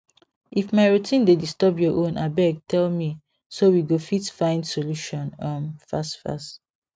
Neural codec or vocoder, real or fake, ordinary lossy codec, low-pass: none; real; none; none